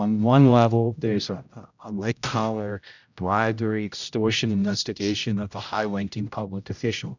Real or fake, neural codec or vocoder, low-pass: fake; codec, 16 kHz, 0.5 kbps, X-Codec, HuBERT features, trained on general audio; 7.2 kHz